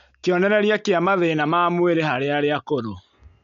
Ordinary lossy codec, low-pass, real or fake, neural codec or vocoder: MP3, 96 kbps; 7.2 kHz; real; none